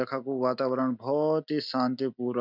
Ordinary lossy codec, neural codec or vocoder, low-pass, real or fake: none; none; 5.4 kHz; real